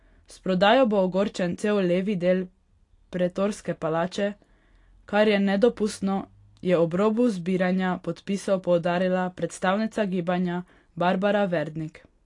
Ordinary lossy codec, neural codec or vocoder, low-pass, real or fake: AAC, 48 kbps; none; 10.8 kHz; real